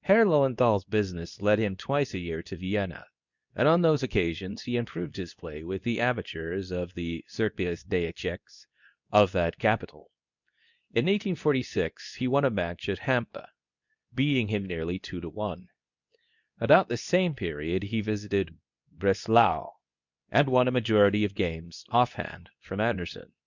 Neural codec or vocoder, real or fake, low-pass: codec, 24 kHz, 0.9 kbps, WavTokenizer, medium speech release version 2; fake; 7.2 kHz